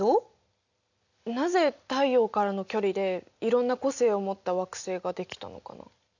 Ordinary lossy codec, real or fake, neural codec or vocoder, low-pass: none; real; none; 7.2 kHz